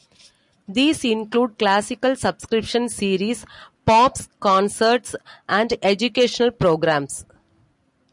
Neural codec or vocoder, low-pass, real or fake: none; 10.8 kHz; real